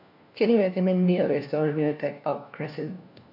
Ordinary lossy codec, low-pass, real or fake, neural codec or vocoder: MP3, 48 kbps; 5.4 kHz; fake; codec, 16 kHz, 1 kbps, FunCodec, trained on LibriTTS, 50 frames a second